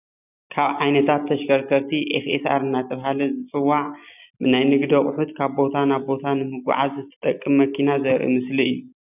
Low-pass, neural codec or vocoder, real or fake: 3.6 kHz; none; real